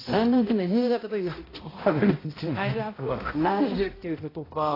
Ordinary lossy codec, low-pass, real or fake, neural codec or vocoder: AAC, 24 kbps; 5.4 kHz; fake; codec, 16 kHz, 0.5 kbps, X-Codec, HuBERT features, trained on balanced general audio